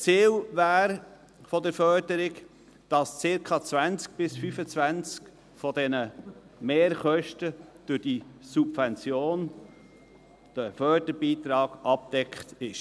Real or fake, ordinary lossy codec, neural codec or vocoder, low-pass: real; none; none; none